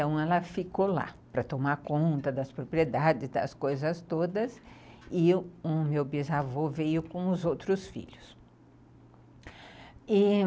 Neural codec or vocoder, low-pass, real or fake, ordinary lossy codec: none; none; real; none